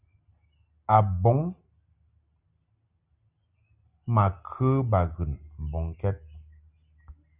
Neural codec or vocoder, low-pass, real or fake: none; 3.6 kHz; real